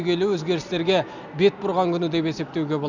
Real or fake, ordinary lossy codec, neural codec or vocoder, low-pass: real; none; none; 7.2 kHz